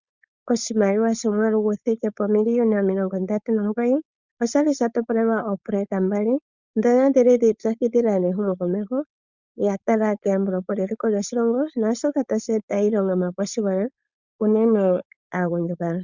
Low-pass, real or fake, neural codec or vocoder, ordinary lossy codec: 7.2 kHz; fake; codec, 16 kHz, 4.8 kbps, FACodec; Opus, 64 kbps